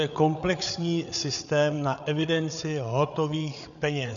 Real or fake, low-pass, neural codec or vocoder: fake; 7.2 kHz; codec, 16 kHz, 8 kbps, FreqCodec, larger model